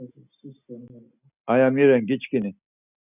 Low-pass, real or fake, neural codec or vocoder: 3.6 kHz; real; none